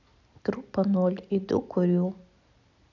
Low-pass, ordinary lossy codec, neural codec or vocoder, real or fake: 7.2 kHz; none; none; real